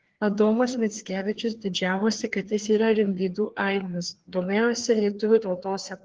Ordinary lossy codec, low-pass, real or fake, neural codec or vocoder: Opus, 16 kbps; 7.2 kHz; fake; codec, 16 kHz, 2 kbps, FreqCodec, larger model